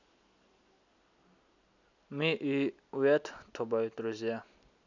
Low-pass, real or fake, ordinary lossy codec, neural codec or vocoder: 7.2 kHz; real; none; none